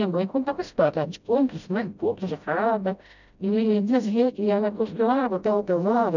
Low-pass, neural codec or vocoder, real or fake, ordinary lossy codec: 7.2 kHz; codec, 16 kHz, 0.5 kbps, FreqCodec, smaller model; fake; none